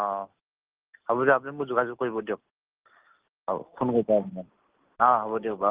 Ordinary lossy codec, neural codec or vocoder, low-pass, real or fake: Opus, 16 kbps; none; 3.6 kHz; real